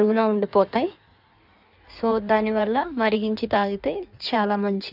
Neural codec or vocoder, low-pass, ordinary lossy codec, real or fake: codec, 16 kHz in and 24 kHz out, 1.1 kbps, FireRedTTS-2 codec; 5.4 kHz; none; fake